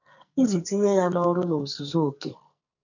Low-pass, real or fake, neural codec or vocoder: 7.2 kHz; fake; codec, 44.1 kHz, 2.6 kbps, SNAC